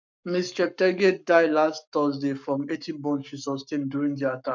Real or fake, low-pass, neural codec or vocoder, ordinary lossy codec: fake; 7.2 kHz; codec, 44.1 kHz, 7.8 kbps, Pupu-Codec; none